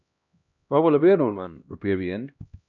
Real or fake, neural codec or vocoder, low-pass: fake; codec, 16 kHz, 1 kbps, X-Codec, HuBERT features, trained on LibriSpeech; 7.2 kHz